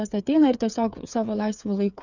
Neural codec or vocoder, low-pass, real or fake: codec, 16 kHz, 8 kbps, FreqCodec, smaller model; 7.2 kHz; fake